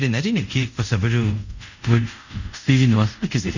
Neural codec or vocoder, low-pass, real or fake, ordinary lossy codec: codec, 24 kHz, 0.5 kbps, DualCodec; 7.2 kHz; fake; none